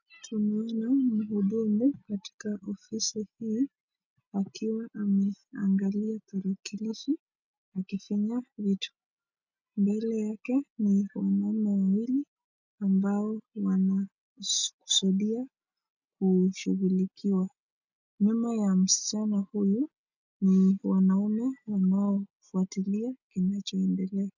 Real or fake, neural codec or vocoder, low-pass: real; none; 7.2 kHz